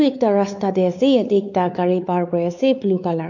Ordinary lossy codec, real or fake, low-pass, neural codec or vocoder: none; fake; 7.2 kHz; codec, 16 kHz, 4 kbps, X-Codec, WavLM features, trained on Multilingual LibriSpeech